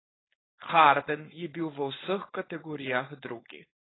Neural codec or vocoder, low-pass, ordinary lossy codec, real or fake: codec, 16 kHz, 4.8 kbps, FACodec; 7.2 kHz; AAC, 16 kbps; fake